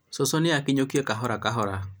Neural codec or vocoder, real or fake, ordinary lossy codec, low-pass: none; real; none; none